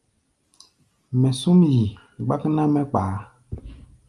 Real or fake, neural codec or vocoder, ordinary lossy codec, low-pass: real; none; Opus, 32 kbps; 10.8 kHz